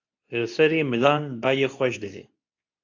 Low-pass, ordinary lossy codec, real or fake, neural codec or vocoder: 7.2 kHz; MP3, 48 kbps; fake; codec, 24 kHz, 0.9 kbps, WavTokenizer, medium speech release version 2